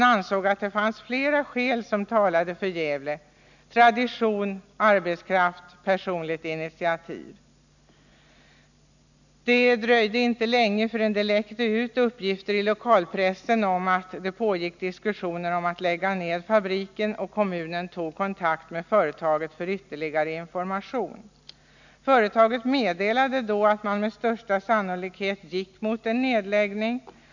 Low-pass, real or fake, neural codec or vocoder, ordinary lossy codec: 7.2 kHz; real; none; none